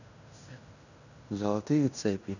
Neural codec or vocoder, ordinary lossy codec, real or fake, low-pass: codec, 16 kHz in and 24 kHz out, 0.9 kbps, LongCat-Audio-Codec, fine tuned four codebook decoder; none; fake; 7.2 kHz